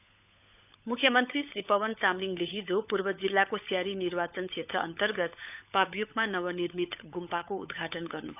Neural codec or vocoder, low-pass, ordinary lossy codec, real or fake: codec, 16 kHz, 16 kbps, FunCodec, trained on LibriTTS, 50 frames a second; 3.6 kHz; none; fake